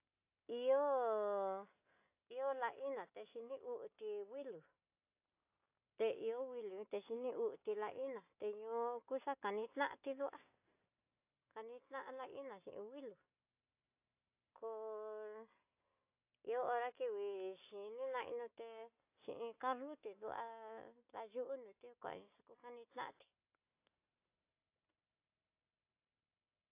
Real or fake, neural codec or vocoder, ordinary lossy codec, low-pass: real; none; AAC, 32 kbps; 3.6 kHz